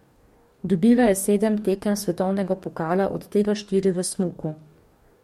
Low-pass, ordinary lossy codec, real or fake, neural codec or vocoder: 19.8 kHz; MP3, 64 kbps; fake; codec, 44.1 kHz, 2.6 kbps, DAC